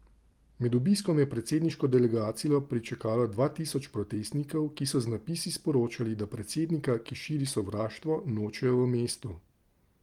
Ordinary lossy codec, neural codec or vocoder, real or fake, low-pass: Opus, 24 kbps; none; real; 19.8 kHz